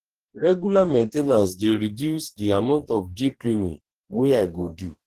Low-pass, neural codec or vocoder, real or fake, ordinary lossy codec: 14.4 kHz; codec, 44.1 kHz, 2.6 kbps, DAC; fake; Opus, 16 kbps